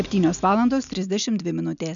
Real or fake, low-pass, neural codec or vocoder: real; 7.2 kHz; none